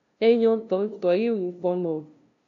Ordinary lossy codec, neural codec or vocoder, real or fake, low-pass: MP3, 96 kbps; codec, 16 kHz, 0.5 kbps, FunCodec, trained on LibriTTS, 25 frames a second; fake; 7.2 kHz